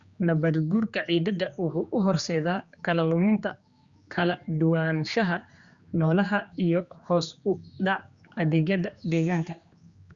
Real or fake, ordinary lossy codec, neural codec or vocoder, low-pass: fake; Opus, 64 kbps; codec, 16 kHz, 2 kbps, X-Codec, HuBERT features, trained on general audio; 7.2 kHz